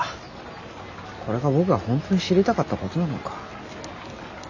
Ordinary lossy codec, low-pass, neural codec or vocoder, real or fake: none; 7.2 kHz; none; real